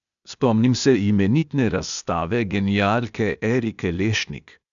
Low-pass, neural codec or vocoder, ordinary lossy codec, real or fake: 7.2 kHz; codec, 16 kHz, 0.8 kbps, ZipCodec; none; fake